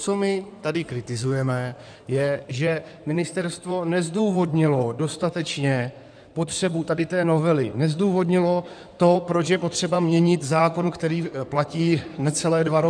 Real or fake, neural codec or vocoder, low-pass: fake; codec, 16 kHz in and 24 kHz out, 2.2 kbps, FireRedTTS-2 codec; 9.9 kHz